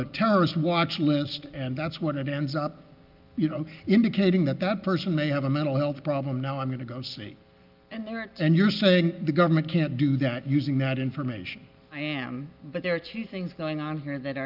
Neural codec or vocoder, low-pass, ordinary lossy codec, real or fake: none; 5.4 kHz; Opus, 24 kbps; real